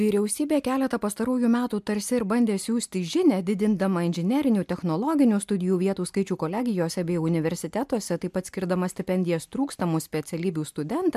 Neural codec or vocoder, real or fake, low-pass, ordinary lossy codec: none; real; 14.4 kHz; MP3, 96 kbps